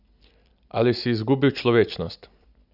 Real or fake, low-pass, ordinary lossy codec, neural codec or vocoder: real; 5.4 kHz; none; none